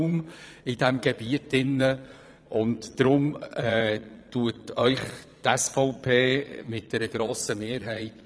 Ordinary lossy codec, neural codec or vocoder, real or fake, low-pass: none; vocoder, 22.05 kHz, 80 mel bands, Vocos; fake; none